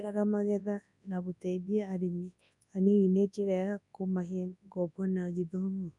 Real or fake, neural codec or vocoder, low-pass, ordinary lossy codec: fake; codec, 24 kHz, 0.9 kbps, WavTokenizer, large speech release; 10.8 kHz; none